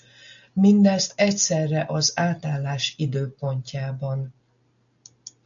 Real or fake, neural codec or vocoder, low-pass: real; none; 7.2 kHz